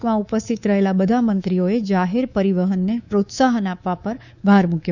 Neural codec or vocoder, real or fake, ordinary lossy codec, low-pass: codec, 24 kHz, 3.1 kbps, DualCodec; fake; none; 7.2 kHz